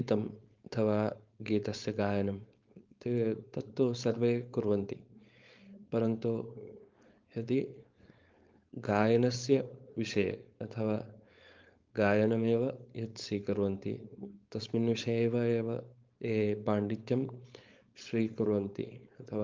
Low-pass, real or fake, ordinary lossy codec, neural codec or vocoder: 7.2 kHz; fake; Opus, 32 kbps; codec, 16 kHz, 4.8 kbps, FACodec